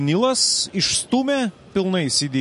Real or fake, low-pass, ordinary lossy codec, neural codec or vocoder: real; 14.4 kHz; MP3, 48 kbps; none